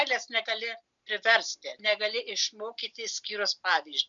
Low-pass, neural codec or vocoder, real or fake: 7.2 kHz; none; real